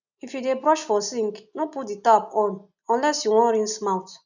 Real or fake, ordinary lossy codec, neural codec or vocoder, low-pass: real; none; none; 7.2 kHz